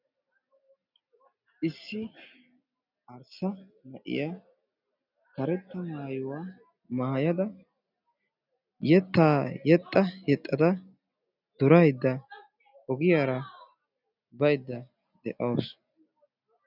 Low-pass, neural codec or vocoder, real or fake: 5.4 kHz; none; real